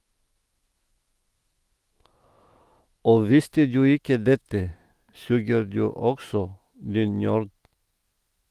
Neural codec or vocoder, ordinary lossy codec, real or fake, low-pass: autoencoder, 48 kHz, 128 numbers a frame, DAC-VAE, trained on Japanese speech; Opus, 24 kbps; fake; 14.4 kHz